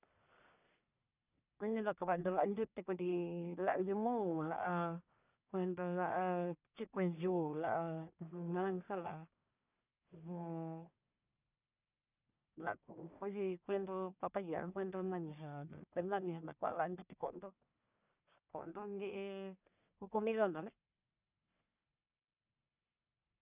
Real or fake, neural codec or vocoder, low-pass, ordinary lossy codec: fake; codec, 44.1 kHz, 1.7 kbps, Pupu-Codec; 3.6 kHz; none